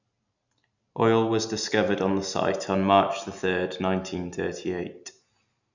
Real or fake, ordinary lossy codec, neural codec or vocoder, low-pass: real; none; none; 7.2 kHz